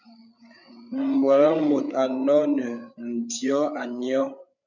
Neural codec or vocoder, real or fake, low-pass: codec, 16 kHz, 8 kbps, FreqCodec, larger model; fake; 7.2 kHz